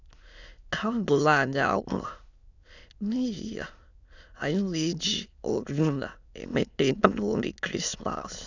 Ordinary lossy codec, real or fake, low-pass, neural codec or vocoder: none; fake; 7.2 kHz; autoencoder, 22.05 kHz, a latent of 192 numbers a frame, VITS, trained on many speakers